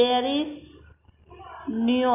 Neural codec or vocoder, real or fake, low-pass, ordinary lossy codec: none; real; 3.6 kHz; AAC, 32 kbps